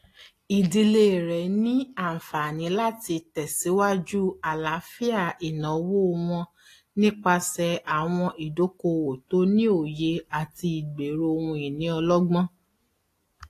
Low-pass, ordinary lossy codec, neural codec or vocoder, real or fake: 14.4 kHz; AAC, 48 kbps; none; real